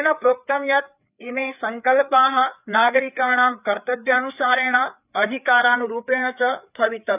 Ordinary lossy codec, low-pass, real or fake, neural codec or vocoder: none; 3.6 kHz; fake; codec, 16 kHz, 4 kbps, FreqCodec, larger model